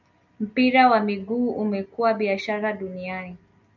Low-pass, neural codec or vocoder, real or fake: 7.2 kHz; none; real